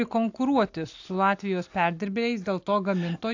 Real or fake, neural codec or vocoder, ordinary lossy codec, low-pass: real; none; AAC, 48 kbps; 7.2 kHz